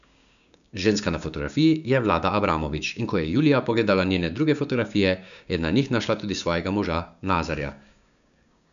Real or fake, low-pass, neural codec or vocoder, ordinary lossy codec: fake; 7.2 kHz; codec, 16 kHz, 6 kbps, DAC; none